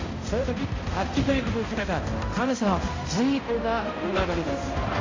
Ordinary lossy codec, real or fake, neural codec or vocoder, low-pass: AAC, 32 kbps; fake; codec, 16 kHz, 0.5 kbps, X-Codec, HuBERT features, trained on balanced general audio; 7.2 kHz